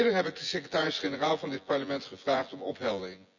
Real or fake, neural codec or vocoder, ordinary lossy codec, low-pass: fake; vocoder, 24 kHz, 100 mel bands, Vocos; none; 7.2 kHz